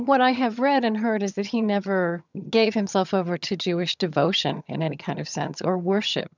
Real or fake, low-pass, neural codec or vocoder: fake; 7.2 kHz; vocoder, 22.05 kHz, 80 mel bands, HiFi-GAN